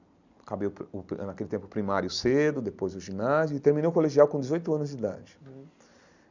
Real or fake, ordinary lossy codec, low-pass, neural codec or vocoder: real; none; 7.2 kHz; none